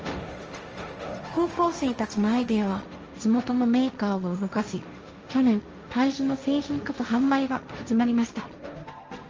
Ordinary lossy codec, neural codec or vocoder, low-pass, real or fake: Opus, 24 kbps; codec, 16 kHz, 1.1 kbps, Voila-Tokenizer; 7.2 kHz; fake